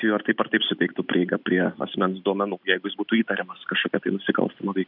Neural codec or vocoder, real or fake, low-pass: none; real; 5.4 kHz